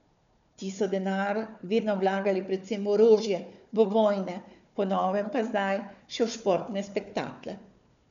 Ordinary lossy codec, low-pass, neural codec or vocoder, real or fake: none; 7.2 kHz; codec, 16 kHz, 4 kbps, FunCodec, trained on Chinese and English, 50 frames a second; fake